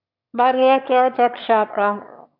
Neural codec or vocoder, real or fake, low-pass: autoencoder, 22.05 kHz, a latent of 192 numbers a frame, VITS, trained on one speaker; fake; 5.4 kHz